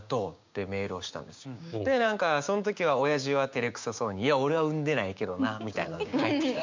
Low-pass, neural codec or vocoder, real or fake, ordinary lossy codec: 7.2 kHz; codec, 16 kHz, 6 kbps, DAC; fake; MP3, 64 kbps